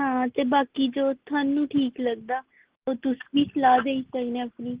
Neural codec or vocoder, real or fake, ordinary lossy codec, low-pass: none; real; Opus, 16 kbps; 3.6 kHz